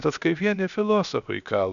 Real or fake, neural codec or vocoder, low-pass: fake; codec, 16 kHz, 0.7 kbps, FocalCodec; 7.2 kHz